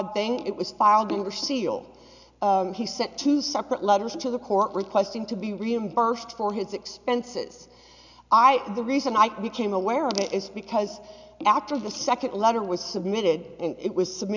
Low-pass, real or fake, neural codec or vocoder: 7.2 kHz; real; none